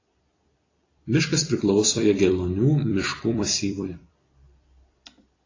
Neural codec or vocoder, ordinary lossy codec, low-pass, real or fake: vocoder, 44.1 kHz, 128 mel bands every 256 samples, BigVGAN v2; AAC, 32 kbps; 7.2 kHz; fake